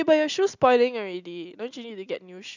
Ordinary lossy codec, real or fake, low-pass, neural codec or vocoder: none; real; 7.2 kHz; none